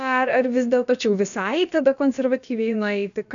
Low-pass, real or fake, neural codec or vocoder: 7.2 kHz; fake; codec, 16 kHz, about 1 kbps, DyCAST, with the encoder's durations